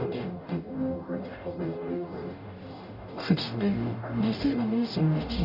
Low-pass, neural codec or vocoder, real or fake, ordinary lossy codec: 5.4 kHz; codec, 44.1 kHz, 0.9 kbps, DAC; fake; none